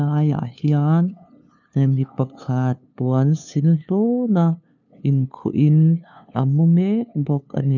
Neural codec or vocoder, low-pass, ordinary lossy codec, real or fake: codec, 16 kHz, 2 kbps, FunCodec, trained on LibriTTS, 25 frames a second; 7.2 kHz; none; fake